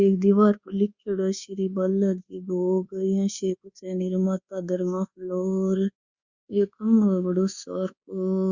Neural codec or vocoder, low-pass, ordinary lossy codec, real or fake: codec, 24 kHz, 1.2 kbps, DualCodec; 7.2 kHz; Opus, 64 kbps; fake